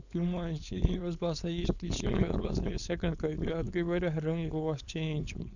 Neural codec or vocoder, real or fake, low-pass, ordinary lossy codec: codec, 16 kHz, 4.8 kbps, FACodec; fake; 7.2 kHz; none